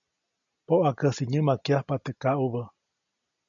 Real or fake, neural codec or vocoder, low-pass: real; none; 7.2 kHz